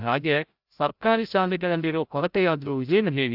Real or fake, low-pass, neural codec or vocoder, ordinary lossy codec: fake; 5.4 kHz; codec, 16 kHz, 0.5 kbps, FreqCodec, larger model; AAC, 48 kbps